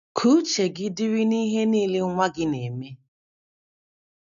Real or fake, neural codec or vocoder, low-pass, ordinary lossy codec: real; none; 7.2 kHz; none